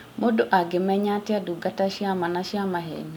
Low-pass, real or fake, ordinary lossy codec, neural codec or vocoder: 19.8 kHz; real; none; none